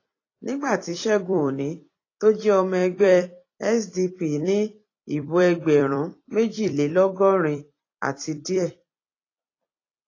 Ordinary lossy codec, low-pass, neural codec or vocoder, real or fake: AAC, 32 kbps; 7.2 kHz; vocoder, 44.1 kHz, 128 mel bands every 256 samples, BigVGAN v2; fake